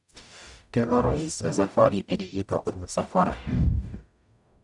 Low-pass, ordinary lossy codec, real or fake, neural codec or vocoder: 10.8 kHz; none; fake; codec, 44.1 kHz, 0.9 kbps, DAC